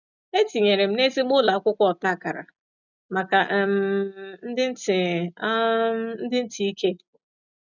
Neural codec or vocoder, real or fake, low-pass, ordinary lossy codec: none; real; 7.2 kHz; none